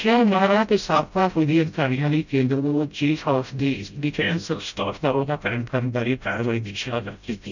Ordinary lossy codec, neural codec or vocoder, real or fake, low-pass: none; codec, 16 kHz, 0.5 kbps, FreqCodec, smaller model; fake; 7.2 kHz